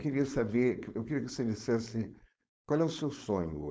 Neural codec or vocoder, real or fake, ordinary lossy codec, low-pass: codec, 16 kHz, 4.8 kbps, FACodec; fake; none; none